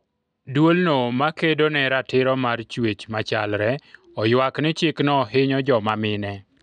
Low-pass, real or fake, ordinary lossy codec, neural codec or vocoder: 9.9 kHz; real; none; none